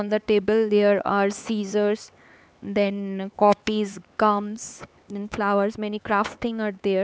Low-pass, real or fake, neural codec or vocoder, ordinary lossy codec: none; fake; codec, 16 kHz, 4 kbps, X-Codec, HuBERT features, trained on LibriSpeech; none